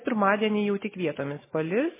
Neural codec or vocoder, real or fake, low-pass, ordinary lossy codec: none; real; 3.6 kHz; MP3, 16 kbps